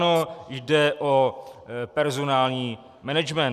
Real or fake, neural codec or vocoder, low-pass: real; none; 14.4 kHz